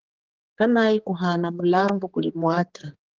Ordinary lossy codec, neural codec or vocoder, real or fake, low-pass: Opus, 16 kbps; codec, 16 kHz, 4 kbps, X-Codec, HuBERT features, trained on general audio; fake; 7.2 kHz